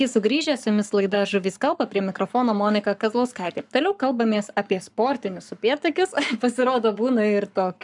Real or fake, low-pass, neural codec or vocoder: fake; 10.8 kHz; codec, 44.1 kHz, 7.8 kbps, Pupu-Codec